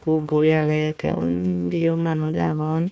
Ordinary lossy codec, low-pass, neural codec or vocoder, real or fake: none; none; codec, 16 kHz, 1 kbps, FunCodec, trained on Chinese and English, 50 frames a second; fake